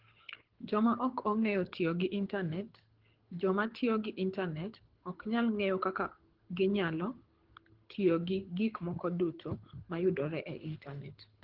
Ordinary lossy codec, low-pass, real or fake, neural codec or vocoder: Opus, 16 kbps; 5.4 kHz; fake; codec, 24 kHz, 6 kbps, HILCodec